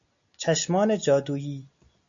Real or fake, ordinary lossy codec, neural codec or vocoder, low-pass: real; AAC, 64 kbps; none; 7.2 kHz